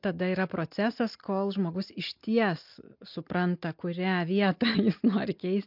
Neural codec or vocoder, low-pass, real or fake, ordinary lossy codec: none; 5.4 kHz; real; MP3, 48 kbps